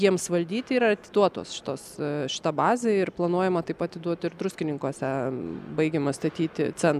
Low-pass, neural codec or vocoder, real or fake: 14.4 kHz; none; real